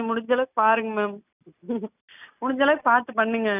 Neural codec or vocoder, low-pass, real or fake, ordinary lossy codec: none; 3.6 kHz; real; none